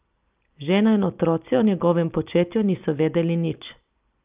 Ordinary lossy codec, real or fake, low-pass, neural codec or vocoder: Opus, 32 kbps; real; 3.6 kHz; none